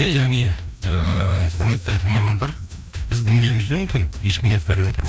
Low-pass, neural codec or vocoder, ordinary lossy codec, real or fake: none; codec, 16 kHz, 1 kbps, FreqCodec, larger model; none; fake